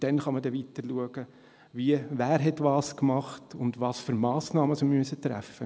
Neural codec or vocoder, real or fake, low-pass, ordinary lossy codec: none; real; none; none